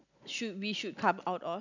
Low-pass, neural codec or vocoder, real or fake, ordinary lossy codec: 7.2 kHz; none; real; none